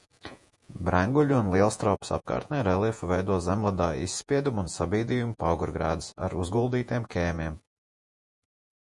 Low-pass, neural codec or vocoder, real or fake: 10.8 kHz; vocoder, 48 kHz, 128 mel bands, Vocos; fake